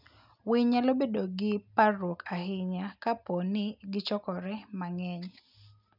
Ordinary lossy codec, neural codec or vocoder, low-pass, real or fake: none; none; 5.4 kHz; real